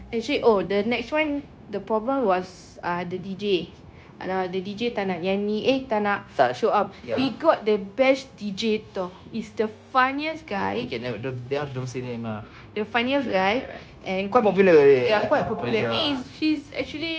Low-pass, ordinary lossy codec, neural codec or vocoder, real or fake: none; none; codec, 16 kHz, 0.9 kbps, LongCat-Audio-Codec; fake